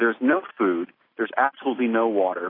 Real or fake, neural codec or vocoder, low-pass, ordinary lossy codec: real; none; 5.4 kHz; AAC, 24 kbps